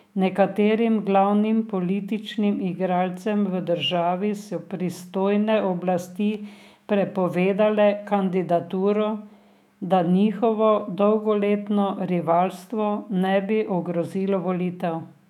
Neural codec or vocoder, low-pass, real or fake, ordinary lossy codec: autoencoder, 48 kHz, 128 numbers a frame, DAC-VAE, trained on Japanese speech; 19.8 kHz; fake; none